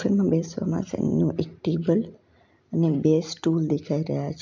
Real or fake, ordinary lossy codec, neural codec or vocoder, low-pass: real; MP3, 64 kbps; none; 7.2 kHz